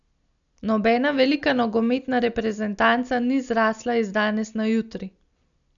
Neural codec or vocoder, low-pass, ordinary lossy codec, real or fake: none; 7.2 kHz; none; real